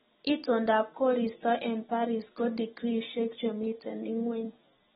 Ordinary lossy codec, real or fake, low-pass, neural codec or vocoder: AAC, 16 kbps; real; 19.8 kHz; none